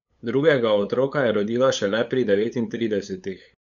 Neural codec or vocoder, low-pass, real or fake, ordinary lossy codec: codec, 16 kHz, 8 kbps, FunCodec, trained on LibriTTS, 25 frames a second; 7.2 kHz; fake; none